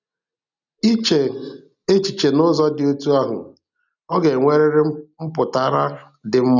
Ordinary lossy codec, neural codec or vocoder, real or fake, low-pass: none; none; real; 7.2 kHz